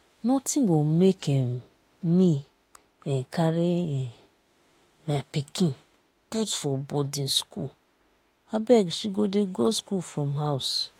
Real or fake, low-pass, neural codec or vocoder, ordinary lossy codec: fake; 19.8 kHz; autoencoder, 48 kHz, 32 numbers a frame, DAC-VAE, trained on Japanese speech; AAC, 48 kbps